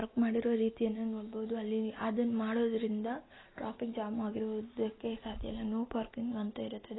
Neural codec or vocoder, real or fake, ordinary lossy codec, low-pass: none; real; AAC, 16 kbps; 7.2 kHz